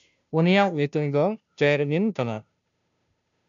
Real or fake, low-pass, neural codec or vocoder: fake; 7.2 kHz; codec, 16 kHz, 0.5 kbps, FunCodec, trained on Chinese and English, 25 frames a second